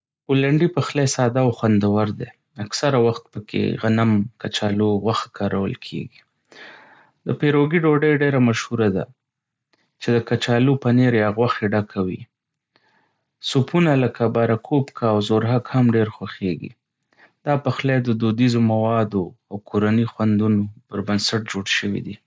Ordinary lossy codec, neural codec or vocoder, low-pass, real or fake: none; none; none; real